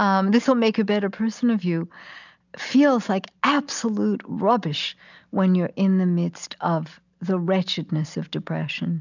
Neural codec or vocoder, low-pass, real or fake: none; 7.2 kHz; real